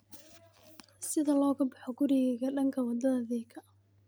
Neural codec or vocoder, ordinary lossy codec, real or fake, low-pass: none; none; real; none